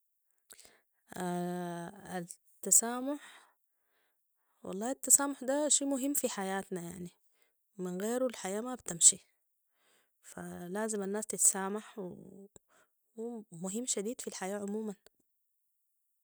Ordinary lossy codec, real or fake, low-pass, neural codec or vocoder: none; real; none; none